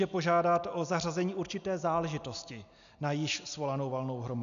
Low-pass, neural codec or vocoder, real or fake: 7.2 kHz; none; real